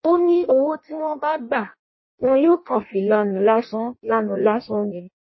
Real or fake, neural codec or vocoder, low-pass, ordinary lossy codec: fake; codec, 16 kHz in and 24 kHz out, 0.6 kbps, FireRedTTS-2 codec; 7.2 kHz; MP3, 24 kbps